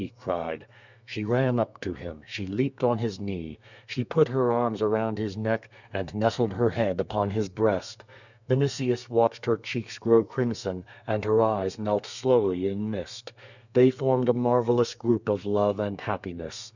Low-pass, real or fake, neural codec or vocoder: 7.2 kHz; fake; codec, 32 kHz, 1.9 kbps, SNAC